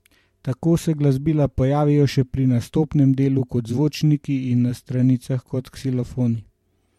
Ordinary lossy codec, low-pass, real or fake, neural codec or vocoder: MP3, 64 kbps; 19.8 kHz; fake; vocoder, 44.1 kHz, 128 mel bands every 512 samples, BigVGAN v2